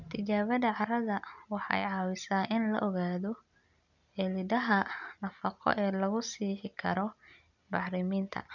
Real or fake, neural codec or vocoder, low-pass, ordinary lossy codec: real; none; 7.2 kHz; none